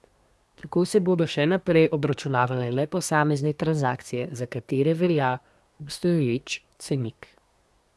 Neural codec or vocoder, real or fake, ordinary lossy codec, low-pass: codec, 24 kHz, 1 kbps, SNAC; fake; none; none